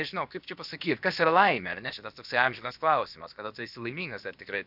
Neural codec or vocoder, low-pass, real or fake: codec, 16 kHz, about 1 kbps, DyCAST, with the encoder's durations; 5.4 kHz; fake